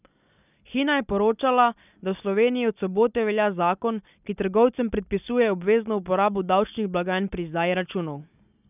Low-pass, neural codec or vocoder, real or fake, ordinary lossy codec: 3.6 kHz; none; real; none